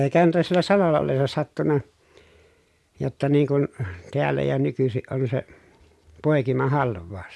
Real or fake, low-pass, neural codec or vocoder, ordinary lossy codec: real; none; none; none